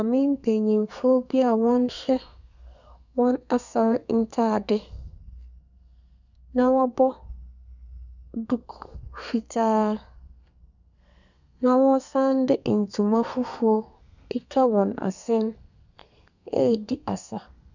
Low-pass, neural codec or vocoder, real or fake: 7.2 kHz; codec, 32 kHz, 1.9 kbps, SNAC; fake